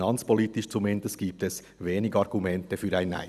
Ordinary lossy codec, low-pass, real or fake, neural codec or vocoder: none; 14.4 kHz; real; none